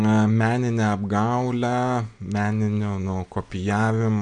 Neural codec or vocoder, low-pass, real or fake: none; 9.9 kHz; real